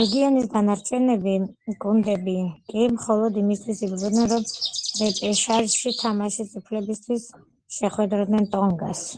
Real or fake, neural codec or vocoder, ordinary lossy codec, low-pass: real; none; Opus, 16 kbps; 9.9 kHz